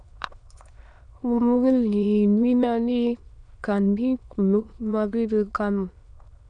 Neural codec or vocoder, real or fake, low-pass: autoencoder, 22.05 kHz, a latent of 192 numbers a frame, VITS, trained on many speakers; fake; 9.9 kHz